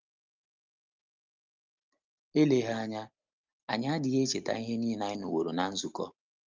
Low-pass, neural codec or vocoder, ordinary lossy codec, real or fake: 7.2 kHz; none; Opus, 24 kbps; real